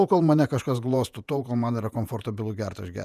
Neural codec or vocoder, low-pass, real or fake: vocoder, 48 kHz, 128 mel bands, Vocos; 14.4 kHz; fake